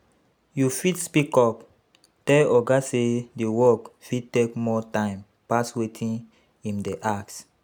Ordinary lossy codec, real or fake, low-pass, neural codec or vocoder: none; real; none; none